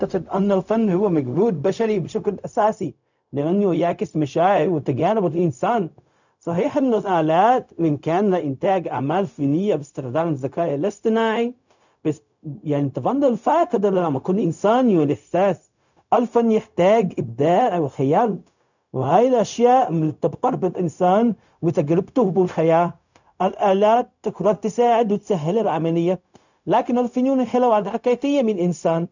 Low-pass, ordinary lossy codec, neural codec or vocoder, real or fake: 7.2 kHz; none; codec, 16 kHz, 0.4 kbps, LongCat-Audio-Codec; fake